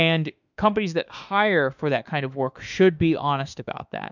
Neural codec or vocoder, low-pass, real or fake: autoencoder, 48 kHz, 32 numbers a frame, DAC-VAE, trained on Japanese speech; 7.2 kHz; fake